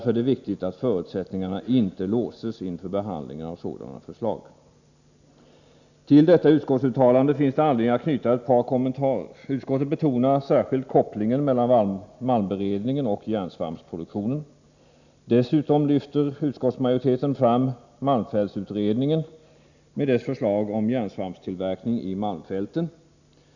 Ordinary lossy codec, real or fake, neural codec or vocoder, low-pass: none; real; none; 7.2 kHz